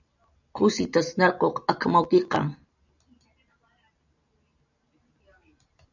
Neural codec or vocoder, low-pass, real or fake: none; 7.2 kHz; real